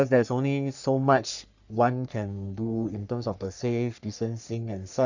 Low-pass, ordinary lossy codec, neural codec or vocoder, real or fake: 7.2 kHz; none; codec, 44.1 kHz, 3.4 kbps, Pupu-Codec; fake